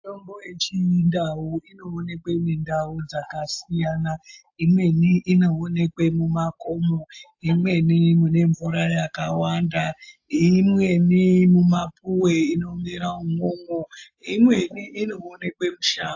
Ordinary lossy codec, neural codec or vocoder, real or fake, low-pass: AAC, 48 kbps; none; real; 7.2 kHz